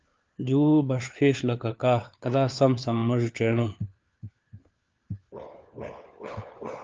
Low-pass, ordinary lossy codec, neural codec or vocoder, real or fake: 7.2 kHz; Opus, 24 kbps; codec, 16 kHz, 4 kbps, FunCodec, trained on LibriTTS, 50 frames a second; fake